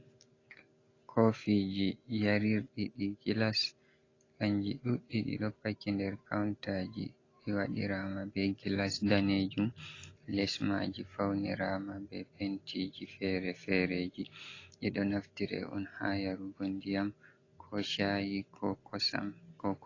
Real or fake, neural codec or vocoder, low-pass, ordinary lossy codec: real; none; 7.2 kHz; AAC, 32 kbps